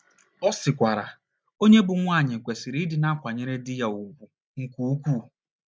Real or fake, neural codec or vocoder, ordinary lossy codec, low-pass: real; none; none; none